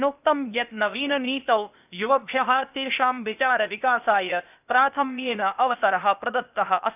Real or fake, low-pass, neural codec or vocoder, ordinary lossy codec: fake; 3.6 kHz; codec, 16 kHz, 0.8 kbps, ZipCodec; none